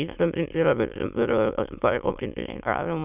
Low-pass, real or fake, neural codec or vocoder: 3.6 kHz; fake; autoencoder, 22.05 kHz, a latent of 192 numbers a frame, VITS, trained on many speakers